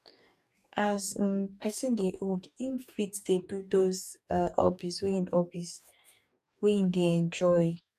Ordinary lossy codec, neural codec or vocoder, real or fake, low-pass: none; codec, 44.1 kHz, 2.6 kbps, DAC; fake; 14.4 kHz